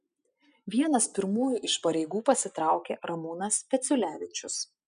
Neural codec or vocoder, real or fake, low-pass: none; real; 9.9 kHz